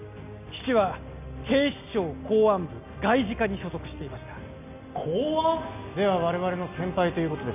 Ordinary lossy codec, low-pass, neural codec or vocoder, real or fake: none; 3.6 kHz; none; real